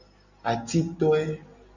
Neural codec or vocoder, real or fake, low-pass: none; real; 7.2 kHz